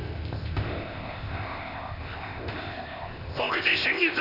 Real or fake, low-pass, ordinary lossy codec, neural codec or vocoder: fake; 5.4 kHz; none; codec, 16 kHz, 0.8 kbps, ZipCodec